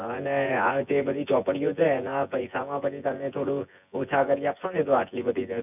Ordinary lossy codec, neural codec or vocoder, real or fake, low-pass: none; vocoder, 24 kHz, 100 mel bands, Vocos; fake; 3.6 kHz